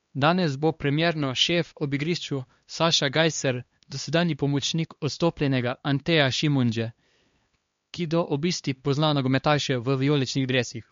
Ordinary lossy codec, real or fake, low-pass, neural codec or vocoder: MP3, 48 kbps; fake; 7.2 kHz; codec, 16 kHz, 2 kbps, X-Codec, HuBERT features, trained on LibriSpeech